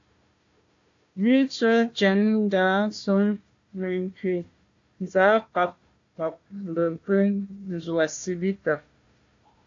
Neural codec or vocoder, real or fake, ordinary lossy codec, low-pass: codec, 16 kHz, 1 kbps, FunCodec, trained on Chinese and English, 50 frames a second; fake; AAC, 48 kbps; 7.2 kHz